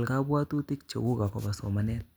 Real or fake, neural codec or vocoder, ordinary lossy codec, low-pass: real; none; none; none